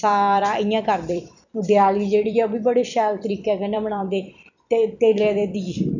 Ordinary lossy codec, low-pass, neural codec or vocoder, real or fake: none; 7.2 kHz; vocoder, 44.1 kHz, 128 mel bands every 256 samples, BigVGAN v2; fake